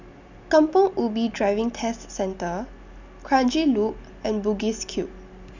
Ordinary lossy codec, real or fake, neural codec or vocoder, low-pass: none; real; none; 7.2 kHz